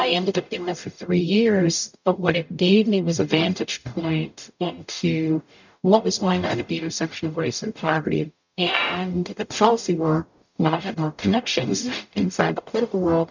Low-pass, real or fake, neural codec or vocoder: 7.2 kHz; fake; codec, 44.1 kHz, 0.9 kbps, DAC